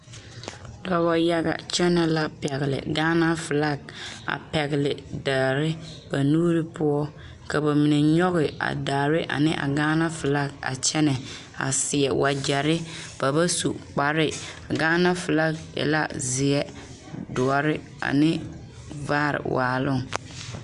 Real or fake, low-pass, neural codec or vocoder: real; 10.8 kHz; none